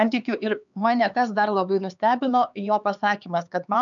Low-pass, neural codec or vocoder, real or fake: 7.2 kHz; codec, 16 kHz, 4 kbps, X-Codec, HuBERT features, trained on LibriSpeech; fake